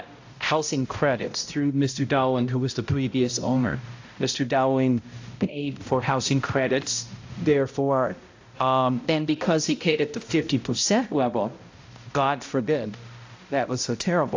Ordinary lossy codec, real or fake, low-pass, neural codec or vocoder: AAC, 48 kbps; fake; 7.2 kHz; codec, 16 kHz, 0.5 kbps, X-Codec, HuBERT features, trained on balanced general audio